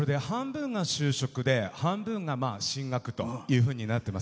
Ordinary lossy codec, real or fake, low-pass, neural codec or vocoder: none; real; none; none